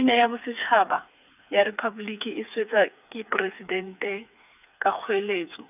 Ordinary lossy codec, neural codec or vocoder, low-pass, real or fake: none; codec, 16 kHz, 4 kbps, FreqCodec, smaller model; 3.6 kHz; fake